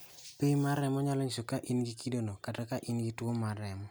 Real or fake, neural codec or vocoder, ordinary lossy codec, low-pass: real; none; none; none